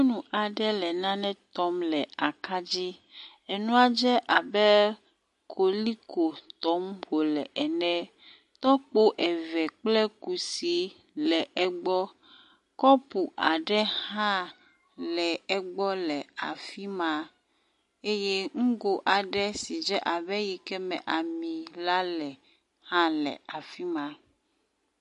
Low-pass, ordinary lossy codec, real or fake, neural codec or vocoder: 14.4 kHz; MP3, 48 kbps; real; none